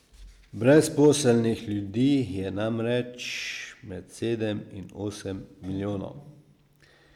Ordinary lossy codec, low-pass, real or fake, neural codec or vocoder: none; 19.8 kHz; real; none